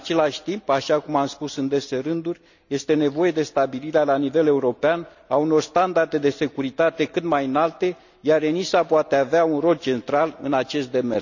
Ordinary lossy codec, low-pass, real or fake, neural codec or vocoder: none; 7.2 kHz; real; none